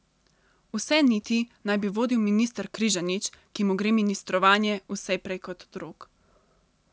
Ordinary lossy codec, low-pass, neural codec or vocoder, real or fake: none; none; none; real